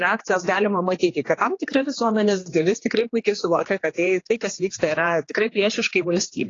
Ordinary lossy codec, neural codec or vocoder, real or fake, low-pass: AAC, 32 kbps; codec, 16 kHz, 2 kbps, X-Codec, HuBERT features, trained on general audio; fake; 7.2 kHz